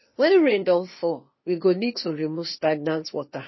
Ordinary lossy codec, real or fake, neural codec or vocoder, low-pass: MP3, 24 kbps; fake; codec, 16 kHz, 0.8 kbps, ZipCodec; 7.2 kHz